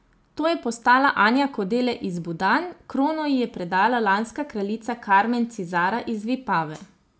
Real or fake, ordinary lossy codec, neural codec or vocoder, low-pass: real; none; none; none